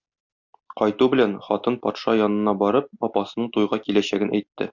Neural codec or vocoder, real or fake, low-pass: none; real; 7.2 kHz